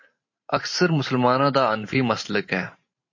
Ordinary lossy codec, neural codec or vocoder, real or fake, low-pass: MP3, 32 kbps; vocoder, 44.1 kHz, 128 mel bands every 512 samples, BigVGAN v2; fake; 7.2 kHz